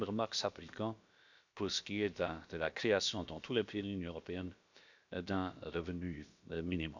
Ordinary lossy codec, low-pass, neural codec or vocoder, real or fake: none; 7.2 kHz; codec, 16 kHz, about 1 kbps, DyCAST, with the encoder's durations; fake